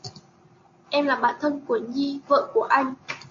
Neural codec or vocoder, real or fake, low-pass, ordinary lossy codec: none; real; 7.2 kHz; MP3, 48 kbps